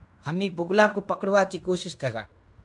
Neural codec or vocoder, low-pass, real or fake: codec, 16 kHz in and 24 kHz out, 0.9 kbps, LongCat-Audio-Codec, fine tuned four codebook decoder; 10.8 kHz; fake